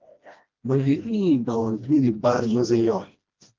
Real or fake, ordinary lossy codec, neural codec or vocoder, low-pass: fake; Opus, 32 kbps; codec, 16 kHz, 1 kbps, FreqCodec, smaller model; 7.2 kHz